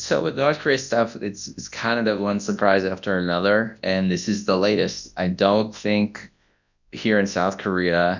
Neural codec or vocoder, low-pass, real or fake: codec, 24 kHz, 0.9 kbps, WavTokenizer, large speech release; 7.2 kHz; fake